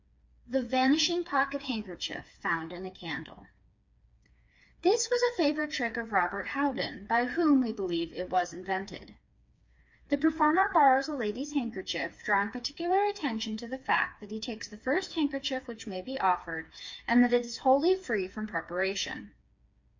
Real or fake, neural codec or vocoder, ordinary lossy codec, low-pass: fake; codec, 16 kHz, 4 kbps, FreqCodec, smaller model; MP3, 48 kbps; 7.2 kHz